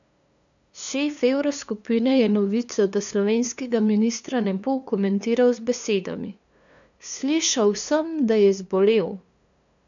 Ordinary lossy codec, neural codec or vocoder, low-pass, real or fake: none; codec, 16 kHz, 2 kbps, FunCodec, trained on LibriTTS, 25 frames a second; 7.2 kHz; fake